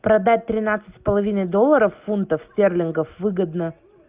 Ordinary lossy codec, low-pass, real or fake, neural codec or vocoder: Opus, 24 kbps; 3.6 kHz; real; none